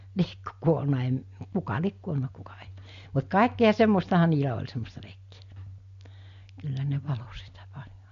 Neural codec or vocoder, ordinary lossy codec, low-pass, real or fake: none; MP3, 48 kbps; 7.2 kHz; real